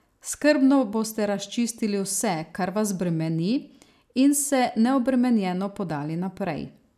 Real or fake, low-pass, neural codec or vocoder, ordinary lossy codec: real; 14.4 kHz; none; none